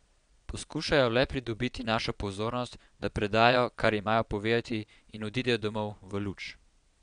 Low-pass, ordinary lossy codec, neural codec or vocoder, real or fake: 9.9 kHz; none; vocoder, 22.05 kHz, 80 mel bands, Vocos; fake